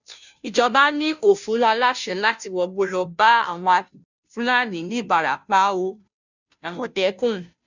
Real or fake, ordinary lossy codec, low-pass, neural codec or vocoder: fake; none; 7.2 kHz; codec, 16 kHz, 0.5 kbps, FunCodec, trained on Chinese and English, 25 frames a second